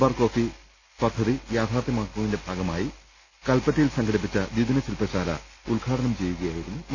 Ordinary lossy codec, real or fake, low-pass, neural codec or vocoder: none; real; none; none